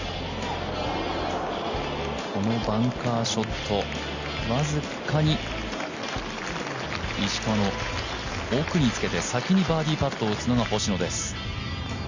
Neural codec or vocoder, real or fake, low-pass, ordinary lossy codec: none; real; 7.2 kHz; Opus, 64 kbps